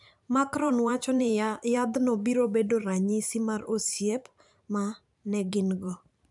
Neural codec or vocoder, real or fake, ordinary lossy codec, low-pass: vocoder, 44.1 kHz, 128 mel bands every 512 samples, BigVGAN v2; fake; none; 10.8 kHz